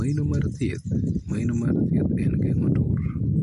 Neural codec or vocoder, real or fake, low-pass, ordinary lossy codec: none; real; 14.4 kHz; MP3, 48 kbps